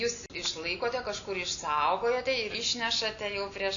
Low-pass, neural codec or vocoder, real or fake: 7.2 kHz; none; real